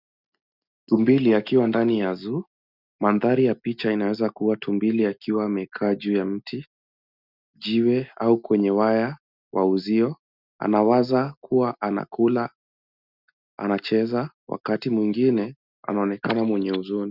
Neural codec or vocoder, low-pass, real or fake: none; 5.4 kHz; real